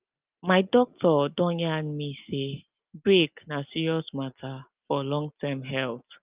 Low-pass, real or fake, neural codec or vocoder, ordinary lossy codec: 3.6 kHz; real; none; Opus, 32 kbps